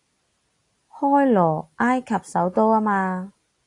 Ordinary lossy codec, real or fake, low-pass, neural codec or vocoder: AAC, 48 kbps; real; 10.8 kHz; none